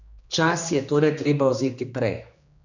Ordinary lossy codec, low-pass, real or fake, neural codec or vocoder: none; 7.2 kHz; fake; codec, 16 kHz, 2 kbps, X-Codec, HuBERT features, trained on general audio